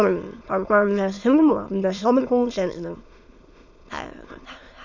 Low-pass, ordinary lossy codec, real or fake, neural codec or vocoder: 7.2 kHz; Opus, 64 kbps; fake; autoencoder, 22.05 kHz, a latent of 192 numbers a frame, VITS, trained on many speakers